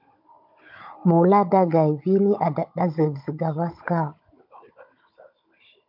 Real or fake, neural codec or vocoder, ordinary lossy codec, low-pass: fake; codec, 16 kHz, 16 kbps, FunCodec, trained on Chinese and English, 50 frames a second; MP3, 48 kbps; 5.4 kHz